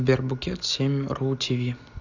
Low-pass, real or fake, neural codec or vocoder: 7.2 kHz; real; none